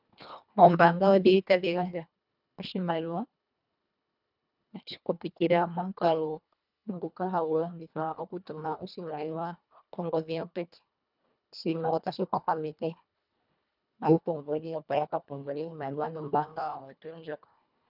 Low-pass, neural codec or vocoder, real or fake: 5.4 kHz; codec, 24 kHz, 1.5 kbps, HILCodec; fake